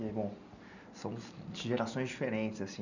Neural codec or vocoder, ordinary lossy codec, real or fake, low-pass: none; none; real; 7.2 kHz